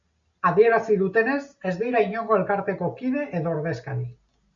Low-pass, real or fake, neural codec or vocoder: 7.2 kHz; real; none